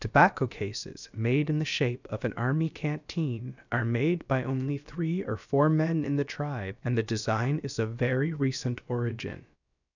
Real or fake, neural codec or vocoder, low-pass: fake; codec, 16 kHz, about 1 kbps, DyCAST, with the encoder's durations; 7.2 kHz